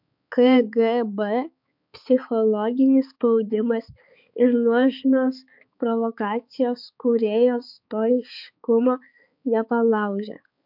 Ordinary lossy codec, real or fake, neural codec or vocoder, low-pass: MP3, 48 kbps; fake; codec, 16 kHz, 4 kbps, X-Codec, HuBERT features, trained on balanced general audio; 5.4 kHz